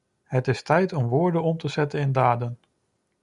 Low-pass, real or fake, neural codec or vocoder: 10.8 kHz; real; none